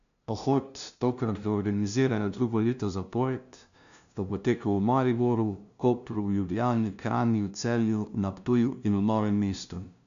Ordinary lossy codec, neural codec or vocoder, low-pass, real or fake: none; codec, 16 kHz, 0.5 kbps, FunCodec, trained on LibriTTS, 25 frames a second; 7.2 kHz; fake